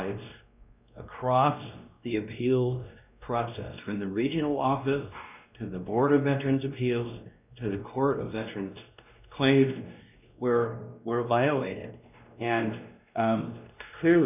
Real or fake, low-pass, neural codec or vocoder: fake; 3.6 kHz; codec, 16 kHz, 1 kbps, X-Codec, WavLM features, trained on Multilingual LibriSpeech